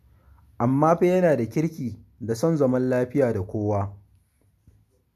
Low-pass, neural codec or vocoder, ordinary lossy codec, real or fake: 14.4 kHz; none; none; real